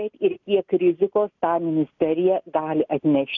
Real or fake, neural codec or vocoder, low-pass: real; none; 7.2 kHz